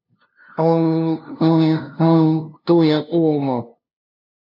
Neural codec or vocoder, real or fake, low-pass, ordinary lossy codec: codec, 16 kHz, 0.5 kbps, FunCodec, trained on LibriTTS, 25 frames a second; fake; 5.4 kHz; none